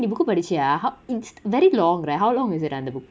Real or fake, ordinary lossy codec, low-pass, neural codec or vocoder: real; none; none; none